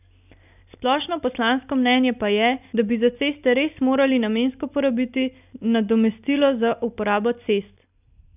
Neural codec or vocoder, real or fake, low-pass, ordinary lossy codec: none; real; 3.6 kHz; none